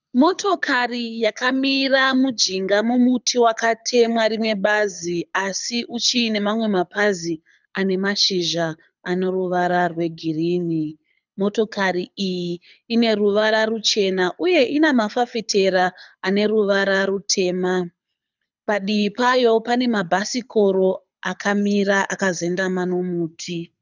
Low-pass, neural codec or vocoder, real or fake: 7.2 kHz; codec, 24 kHz, 6 kbps, HILCodec; fake